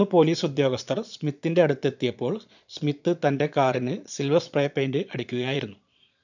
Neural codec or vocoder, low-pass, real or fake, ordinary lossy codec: vocoder, 22.05 kHz, 80 mel bands, Vocos; 7.2 kHz; fake; none